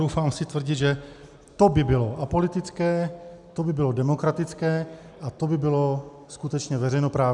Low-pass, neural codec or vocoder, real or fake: 10.8 kHz; none; real